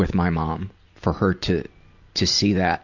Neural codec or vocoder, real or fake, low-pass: none; real; 7.2 kHz